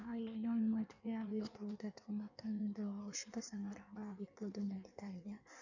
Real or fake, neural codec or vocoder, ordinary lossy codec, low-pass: fake; codec, 16 kHz in and 24 kHz out, 1.1 kbps, FireRedTTS-2 codec; none; 7.2 kHz